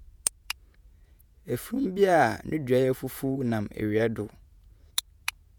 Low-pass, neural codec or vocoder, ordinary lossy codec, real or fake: none; vocoder, 48 kHz, 128 mel bands, Vocos; none; fake